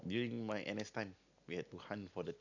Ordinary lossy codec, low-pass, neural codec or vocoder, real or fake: none; 7.2 kHz; none; real